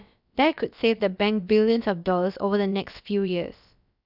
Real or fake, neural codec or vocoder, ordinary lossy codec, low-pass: fake; codec, 16 kHz, about 1 kbps, DyCAST, with the encoder's durations; none; 5.4 kHz